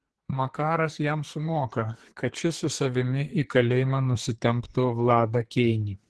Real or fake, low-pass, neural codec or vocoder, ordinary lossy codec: fake; 10.8 kHz; codec, 44.1 kHz, 2.6 kbps, SNAC; Opus, 16 kbps